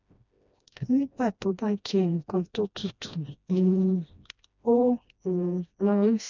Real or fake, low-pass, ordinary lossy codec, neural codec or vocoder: fake; 7.2 kHz; none; codec, 16 kHz, 1 kbps, FreqCodec, smaller model